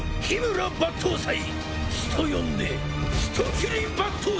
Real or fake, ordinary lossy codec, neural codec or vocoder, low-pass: real; none; none; none